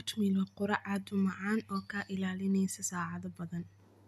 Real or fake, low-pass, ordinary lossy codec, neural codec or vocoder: real; 14.4 kHz; none; none